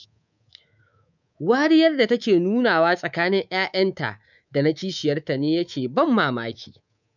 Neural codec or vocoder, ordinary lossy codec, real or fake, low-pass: codec, 24 kHz, 3.1 kbps, DualCodec; none; fake; 7.2 kHz